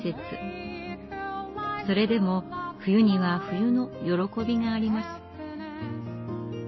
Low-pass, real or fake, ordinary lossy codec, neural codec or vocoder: 7.2 kHz; real; MP3, 24 kbps; none